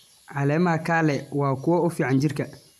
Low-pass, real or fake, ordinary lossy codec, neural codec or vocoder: 14.4 kHz; real; AAC, 96 kbps; none